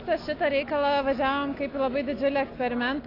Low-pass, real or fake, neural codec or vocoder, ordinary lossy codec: 5.4 kHz; real; none; AAC, 32 kbps